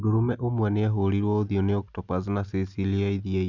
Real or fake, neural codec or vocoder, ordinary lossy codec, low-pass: real; none; none; 7.2 kHz